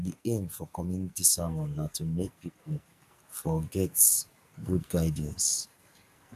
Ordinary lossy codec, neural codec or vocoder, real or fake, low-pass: none; codec, 44.1 kHz, 2.6 kbps, SNAC; fake; 14.4 kHz